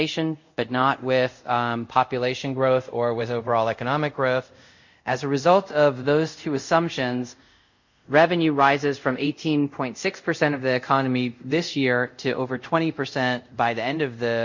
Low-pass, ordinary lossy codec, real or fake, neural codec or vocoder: 7.2 kHz; MP3, 64 kbps; fake; codec, 24 kHz, 0.5 kbps, DualCodec